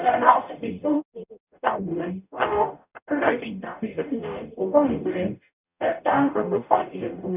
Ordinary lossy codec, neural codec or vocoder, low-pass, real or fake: none; codec, 44.1 kHz, 0.9 kbps, DAC; 3.6 kHz; fake